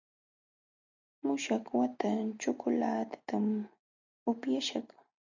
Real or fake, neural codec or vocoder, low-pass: real; none; 7.2 kHz